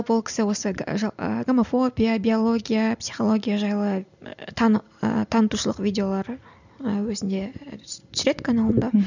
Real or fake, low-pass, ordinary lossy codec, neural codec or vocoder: real; 7.2 kHz; none; none